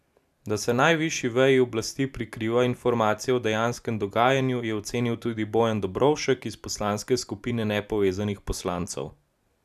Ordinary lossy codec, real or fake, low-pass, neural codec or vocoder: none; real; 14.4 kHz; none